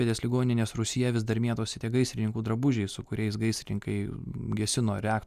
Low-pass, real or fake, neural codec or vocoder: 14.4 kHz; real; none